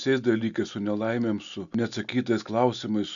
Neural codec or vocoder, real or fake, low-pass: none; real; 7.2 kHz